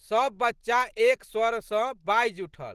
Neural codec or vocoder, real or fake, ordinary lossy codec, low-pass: none; real; Opus, 16 kbps; 14.4 kHz